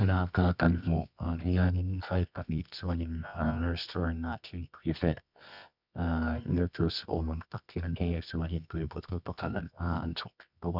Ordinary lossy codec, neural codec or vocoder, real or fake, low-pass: none; codec, 24 kHz, 0.9 kbps, WavTokenizer, medium music audio release; fake; 5.4 kHz